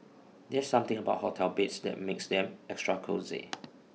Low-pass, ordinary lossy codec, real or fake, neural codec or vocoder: none; none; real; none